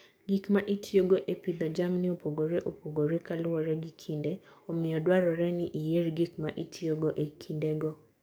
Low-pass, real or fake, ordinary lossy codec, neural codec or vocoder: none; fake; none; codec, 44.1 kHz, 7.8 kbps, DAC